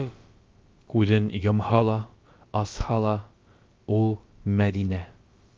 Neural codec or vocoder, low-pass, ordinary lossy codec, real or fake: codec, 16 kHz, about 1 kbps, DyCAST, with the encoder's durations; 7.2 kHz; Opus, 24 kbps; fake